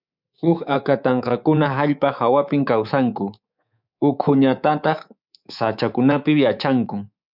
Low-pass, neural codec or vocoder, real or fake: 5.4 kHz; codec, 24 kHz, 3.1 kbps, DualCodec; fake